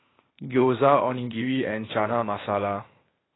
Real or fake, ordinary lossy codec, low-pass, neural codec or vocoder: fake; AAC, 16 kbps; 7.2 kHz; codec, 16 kHz, 0.8 kbps, ZipCodec